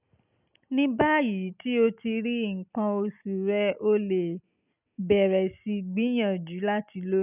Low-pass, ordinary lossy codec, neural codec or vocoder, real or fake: 3.6 kHz; none; none; real